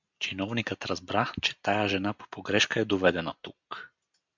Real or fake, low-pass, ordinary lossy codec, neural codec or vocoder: real; 7.2 kHz; MP3, 64 kbps; none